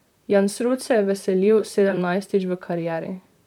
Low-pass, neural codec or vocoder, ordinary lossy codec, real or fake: 19.8 kHz; vocoder, 44.1 kHz, 128 mel bands, Pupu-Vocoder; none; fake